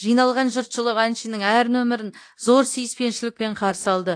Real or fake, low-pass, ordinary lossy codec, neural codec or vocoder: fake; 9.9 kHz; AAC, 48 kbps; codec, 24 kHz, 0.9 kbps, DualCodec